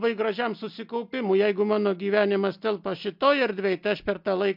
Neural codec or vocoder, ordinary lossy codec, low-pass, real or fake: none; MP3, 48 kbps; 5.4 kHz; real